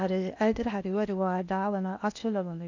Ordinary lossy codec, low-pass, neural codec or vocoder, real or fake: none; 7.2 kHz; codec, 16 kHz in and 24 kHz out, 0.8 kbps, FocalCodec, streaming, 65536 codes; fake